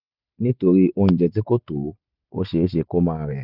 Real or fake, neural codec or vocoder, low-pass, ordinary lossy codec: real; none; 5.4 kHz; none